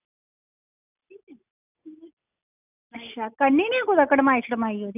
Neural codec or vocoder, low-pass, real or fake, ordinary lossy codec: none; 3.6 kHz; real; none